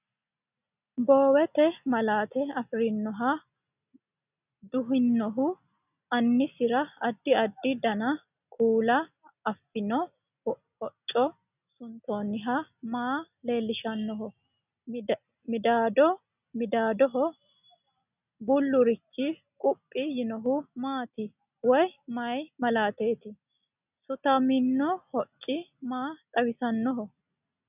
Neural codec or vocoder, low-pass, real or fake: none; 3.6 kHz; real